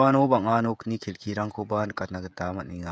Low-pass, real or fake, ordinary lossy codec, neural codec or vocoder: none; fake; none; codec, 16 kHz, 16 kbps, FreqCodec, smaller model